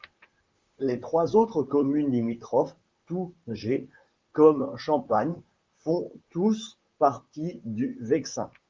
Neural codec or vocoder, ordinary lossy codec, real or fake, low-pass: vocoder, 44.1 kHz, 128 mel bands, Pupu-Vocoder; Opus, 32 kbps; fake; 7.2 kHz